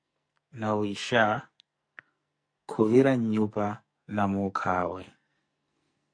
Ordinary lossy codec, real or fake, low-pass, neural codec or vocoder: MP3, 64 kbps; fake; 9.9 kHz; codec, 44.1 kHz, 2.6 kbps, SNAC